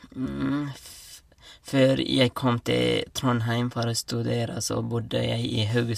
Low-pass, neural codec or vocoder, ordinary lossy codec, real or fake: 14.4 kHz; none; MP3, 64 kbps; real